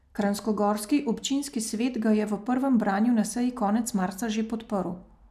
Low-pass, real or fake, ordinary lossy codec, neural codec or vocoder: 14.4 kHz; real; none; none